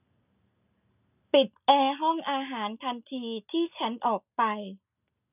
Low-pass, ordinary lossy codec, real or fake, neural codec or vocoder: 3.6 kHz; none; real; none